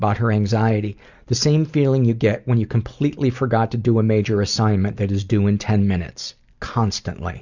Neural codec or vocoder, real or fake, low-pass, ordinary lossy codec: none; real; 7.2 kHz; Opus, 64 kbps